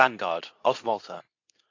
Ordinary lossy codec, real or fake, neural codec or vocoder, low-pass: AAC, 48 kbps; fake; codec, 24 kHz, 0.9 kbps, WavTokenizer, medium speech release version 2; 7.2 kHz